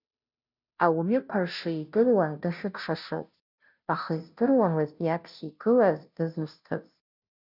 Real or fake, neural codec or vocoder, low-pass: fake; codec, 16 kHz, 0.5 kbps, FunCodec, trained on Chinese and English, 25 frames a second; 5.4 kHz